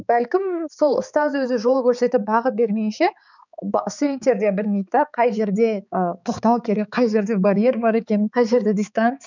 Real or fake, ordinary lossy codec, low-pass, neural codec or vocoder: fake; none; 7.2 kHz; codec, 16 kHz, 4 kbps, X-Codec, HuBERT features, trained on balanced general audio